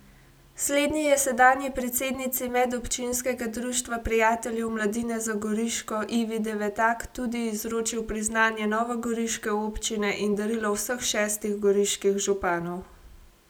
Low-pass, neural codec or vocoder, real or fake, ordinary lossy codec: none; none; real; none